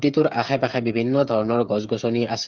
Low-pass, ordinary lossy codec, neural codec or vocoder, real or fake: 7.2 kHz; Opus, 24 kbps; codec, 16 kHz, 8 kbps, FreqCodec, smaller model; fake